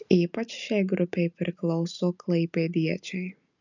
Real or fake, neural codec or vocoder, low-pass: real; none; 7.2 kHz